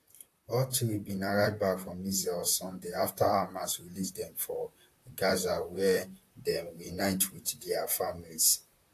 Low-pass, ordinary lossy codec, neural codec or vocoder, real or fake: 14.4 kHz; AAC, 64 kbps; vocoder, 44.1 kHz, 128 mel bands, Pupu-Vocoder; fake